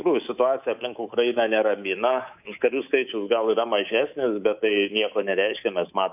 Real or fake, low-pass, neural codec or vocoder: fake; 3.6 kHz; codec, 24 kHz, 3.1 kbps, DualCodec